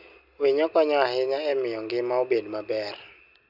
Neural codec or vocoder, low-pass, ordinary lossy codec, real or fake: none; 5.4 kHz; none; real